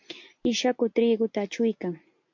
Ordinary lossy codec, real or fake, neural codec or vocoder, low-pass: MP3, 48 kbps; real; none; 7.2 kHz